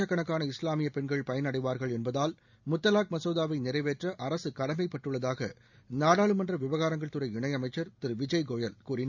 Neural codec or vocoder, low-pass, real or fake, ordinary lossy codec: none; 7.2 kHz; real; none